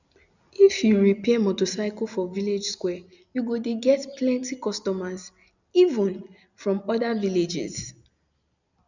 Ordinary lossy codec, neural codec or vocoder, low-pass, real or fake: none; none; 7.2 kHz; real